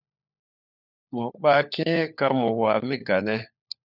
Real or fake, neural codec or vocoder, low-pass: fake; codec, 16 kHz, 4 kbps, FunCodec, trained on LibriTTS, 50 frames a second; 5.4 kHz